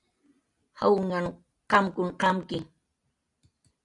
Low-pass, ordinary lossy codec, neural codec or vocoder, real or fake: 10.8 kHz; AAC, 64 kbps; none; real